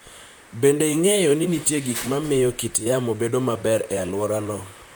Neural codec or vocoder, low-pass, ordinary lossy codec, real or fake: vocoder, 44.1 kHz, 128 mel bands, Pupu-Vocoder; none; none; fake